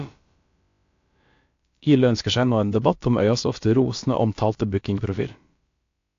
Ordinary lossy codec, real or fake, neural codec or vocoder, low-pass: AAC, 48 kbps; fake; codec, 16 kHz, about 1 kbps, DyCAST, with the encoder's durations; 7.2 kHz